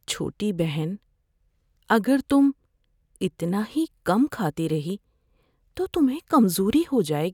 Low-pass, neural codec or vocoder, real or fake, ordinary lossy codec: 19.8 kHz; none; real; none